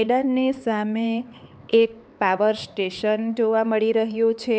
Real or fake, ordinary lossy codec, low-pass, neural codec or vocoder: fake; none; none; codec, 16 kHz, 4 kbps, X-Codec, HuBERT features, trained on LibriSpeech